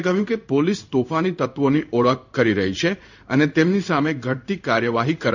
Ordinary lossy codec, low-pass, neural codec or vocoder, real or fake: none; 7.2 kHz; codec, 16 kHz in and 24 kHz out, 1 kbps, XY-Tokenizer; fake